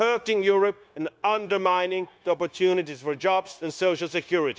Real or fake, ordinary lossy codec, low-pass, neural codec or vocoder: fake; none; none; codec, 16 kHz, 0.9 kbps, LongCat-Audio-Codec